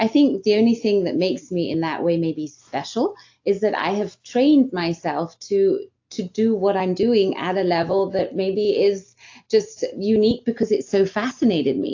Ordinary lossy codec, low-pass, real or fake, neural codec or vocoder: AAC, 48 kbps; 7.2 kHz; real; none